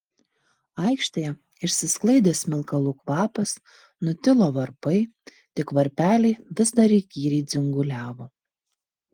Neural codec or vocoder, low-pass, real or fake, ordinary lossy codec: none; 19.8 kHz; real; Opus, 24 kbps